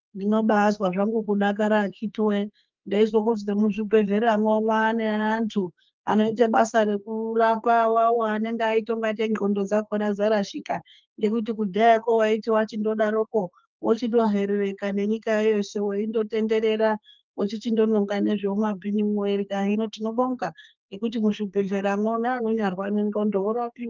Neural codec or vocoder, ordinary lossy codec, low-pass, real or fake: codec, 32 kHz, 1.9 kbps, SNAC; Opus, 24 kbps; 7.2 kHz; fake